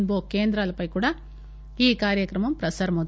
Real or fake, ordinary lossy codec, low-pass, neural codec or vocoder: real; none; none; none